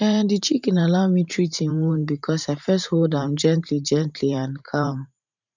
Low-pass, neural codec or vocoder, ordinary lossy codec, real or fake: 7.2 kHz; vocoder, 44.1 kHz, 128 mel bands every 512 samples, BigVGAN v2; none; fake